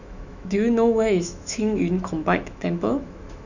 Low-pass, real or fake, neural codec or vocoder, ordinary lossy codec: 7.2 kHz; fake; autoencoder, 48 kHz, 128 numbers a frame, DAC-VAE, trained on Japanese speech; none